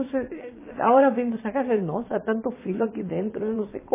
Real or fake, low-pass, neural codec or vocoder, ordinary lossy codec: fake; 3.6 kHz; vocoder, 22.05 kHz, 80 mel bands, Vocos; MP3, 16 kbps